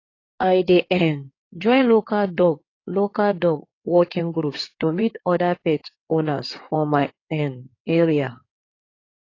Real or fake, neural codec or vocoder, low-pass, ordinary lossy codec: fake; codec, 16 kHz in and 24 kHz out, 2.2 kbps, FireRedTTS-2 codec; 7.2 kHz; AAC, 32 kbps